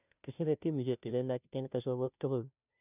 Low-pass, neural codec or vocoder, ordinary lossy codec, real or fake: 3.6 kHz; codec, 16 kHz, 0.5 kbps, FunCodec, trained on Chinese and English, 25 frames a second; none; fake